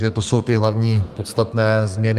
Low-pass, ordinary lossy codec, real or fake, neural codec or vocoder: 14.4 kHz; Opus, 32 kbps; fake; codec, 32 kHz, 1.9 kbps, SNAC